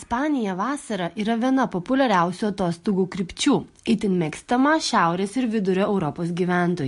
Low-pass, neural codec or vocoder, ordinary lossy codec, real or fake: 10.8 kHz; none; MP3, 48 kbps; real